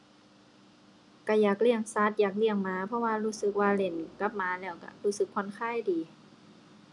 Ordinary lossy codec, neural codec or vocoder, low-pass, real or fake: none; none; 10.8 kHz; real